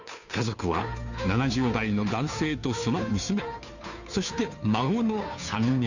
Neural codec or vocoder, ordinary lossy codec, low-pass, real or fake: codec, 16 kHz, 2 kbps, FunCodec, trained on Chinese and English, 25 frames a second; none; 7.2 kHz; fake